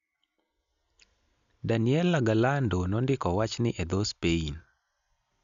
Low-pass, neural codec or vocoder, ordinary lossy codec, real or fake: 7.2 kHz; none; none; real